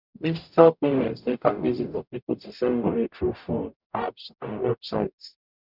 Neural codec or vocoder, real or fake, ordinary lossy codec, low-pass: codec, 44.1 kHz, 0.9 kbps, DAC; fake; none; 5.4 kHz